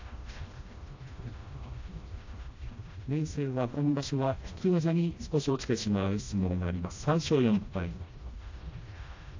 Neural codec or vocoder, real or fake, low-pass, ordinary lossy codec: codec, 16 kHz, 1 kbps, FreqCodec, smaller model; fake; 7.2 kHz; AAC, 48 kbps